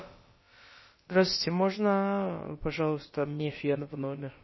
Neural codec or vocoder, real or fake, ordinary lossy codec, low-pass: codec, 16 kHz, about 1 kbps, DyCAST, with the encoder's durations; fake; MP3, 24 kbps; 7.2 kHz